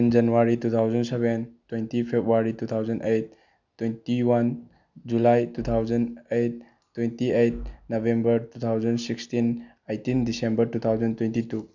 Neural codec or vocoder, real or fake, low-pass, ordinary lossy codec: none; real; 7.2 kHz; none